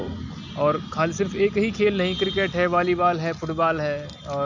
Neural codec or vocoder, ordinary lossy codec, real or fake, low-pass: none; none; real; 7.2 kHz